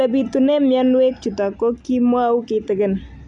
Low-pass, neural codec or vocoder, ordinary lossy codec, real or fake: 10.8 kHz; none; none; real